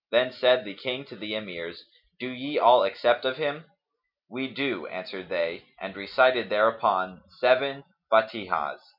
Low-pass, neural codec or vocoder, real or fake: 5.4 kHz; none; real